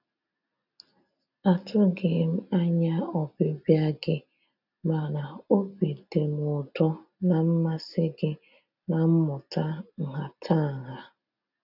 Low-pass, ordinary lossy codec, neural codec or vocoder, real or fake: 5.4 kHz; none; none; real